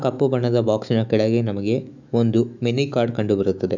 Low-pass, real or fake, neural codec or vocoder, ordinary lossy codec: 7.2 kHz; fake; autoencoder, 48 kHz, 128 numbers a frame, DAC-VAE, trained on Japanese speech; none